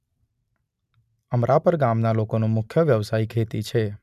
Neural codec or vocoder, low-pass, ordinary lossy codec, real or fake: none; 14.4 kHz; none; real